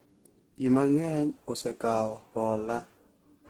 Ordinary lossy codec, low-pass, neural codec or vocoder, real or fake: Opus, 16 kbps; 19.8 kHz; codec, 44.1 kHz, 2.6 kbps, DAC; fake